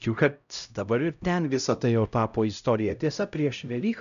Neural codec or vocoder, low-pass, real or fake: codec, 16 kHz, 0.5 kbps, X-Codec, HuBERT features, trained on LibriSpeech; 7.2 kHz; fake